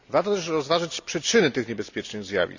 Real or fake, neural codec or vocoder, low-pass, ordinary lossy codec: real; none; 7.2 kHz; none